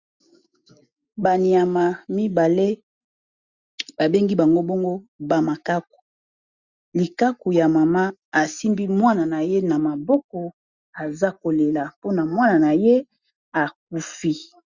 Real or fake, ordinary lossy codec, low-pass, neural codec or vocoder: real; Opus, 64 kbps; 7.2 kHz; none